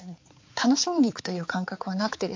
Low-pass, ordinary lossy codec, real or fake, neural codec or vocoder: 7.2 kHz; MP3, 48 kbps; fake; codec, 16 kHz, 4 kbps, X-Codec, HuBERT features, trained on balanced general audio